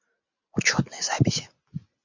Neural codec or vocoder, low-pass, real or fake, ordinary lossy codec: none; 7.2 kHz; real; MP3, 64 kbps